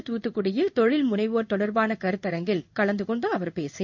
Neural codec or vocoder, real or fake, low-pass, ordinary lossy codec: codec, 16 kHz in and 24 kHz out, 1 kbps, XY-Tokenizer; fake; 7.2 kHz; none